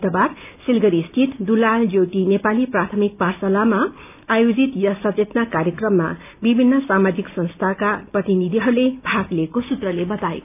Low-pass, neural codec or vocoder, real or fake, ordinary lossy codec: 3.6 kHz; none; real; none